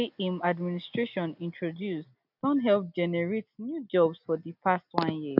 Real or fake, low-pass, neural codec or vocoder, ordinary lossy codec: real; 5.4 kHz; none; none